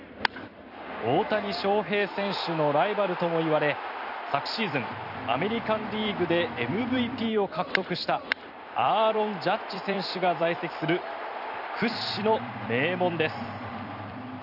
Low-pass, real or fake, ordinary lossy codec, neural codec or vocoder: 5.4 kHz; fake; none; vocoder, 44.1 kHz, 128 mel bands every 256 samples, BigVGAN v2